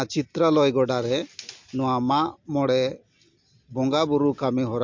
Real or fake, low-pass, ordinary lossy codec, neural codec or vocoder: real; 7.2 kHz; MP3, 48 kbps; none